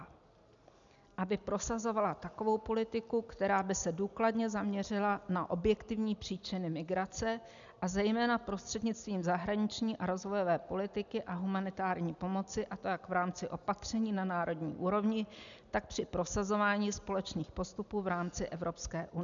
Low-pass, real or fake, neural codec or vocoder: 7.2 kHz; real; none